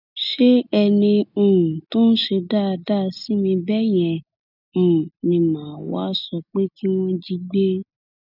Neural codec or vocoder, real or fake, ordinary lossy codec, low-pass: none; real; none; 5.4 kHz